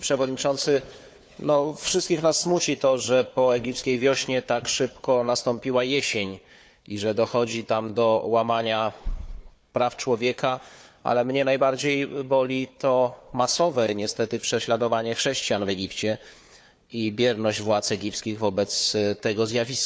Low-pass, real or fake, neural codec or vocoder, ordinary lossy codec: none; fake; codec, 16 kHz, 4 kbps, FunCodec, trained on Chinese and English, 50 frames a second; none